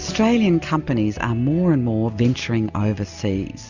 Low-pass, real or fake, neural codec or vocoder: 7.2 kHz; real; none